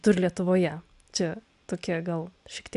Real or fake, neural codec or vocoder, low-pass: real; none; 10.8 kHz